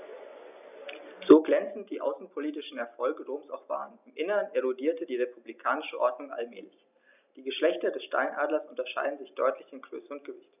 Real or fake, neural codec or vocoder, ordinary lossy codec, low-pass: real; none; none; 3.6 kHz